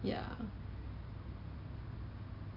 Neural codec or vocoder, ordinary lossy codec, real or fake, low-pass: none; none; real; 5.4 kHz